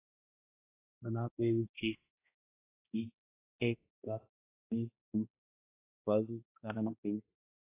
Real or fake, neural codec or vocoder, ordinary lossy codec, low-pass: fake; codec, 16 kHz, 1 kbps, X-Codec, HuBERT features, trained on balanced general audio; AAC, 24 kbps; 3.6 kHz